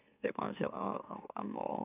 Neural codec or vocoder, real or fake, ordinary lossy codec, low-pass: autoencoder, 44.1 kHz, a latent of 192 numbers a frame, MeloTTS; fake; none; 3.6 kHz